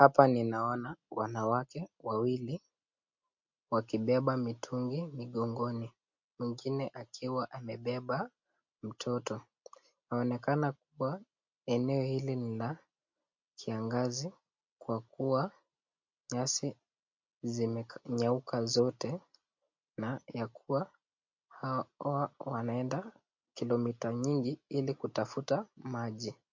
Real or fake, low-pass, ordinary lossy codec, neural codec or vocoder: real; 7.2 kHz; MP3, 48 kbps; none